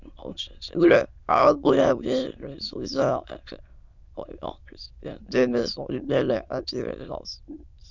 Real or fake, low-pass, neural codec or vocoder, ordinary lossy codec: fake; 7.2 kHz; autoencoder, 22.05 kHz, a latent of 192 numbers a frame, VITS, trained on many speakers; Opus, 64 kbps